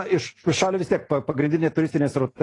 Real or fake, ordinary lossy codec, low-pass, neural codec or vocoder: real; AAC, 32 kbps; 10.8 kHz; none